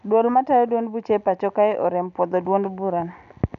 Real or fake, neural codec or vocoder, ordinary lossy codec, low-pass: real; none; none; 7.2 kHz